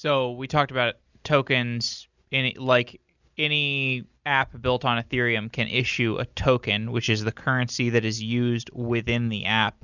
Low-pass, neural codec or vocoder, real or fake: 7.2 kHz; none; real